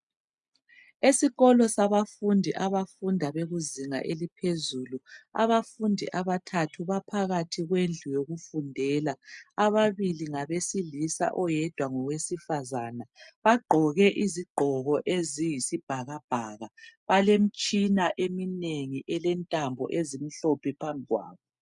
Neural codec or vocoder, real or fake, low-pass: none; real; 10.8 kHz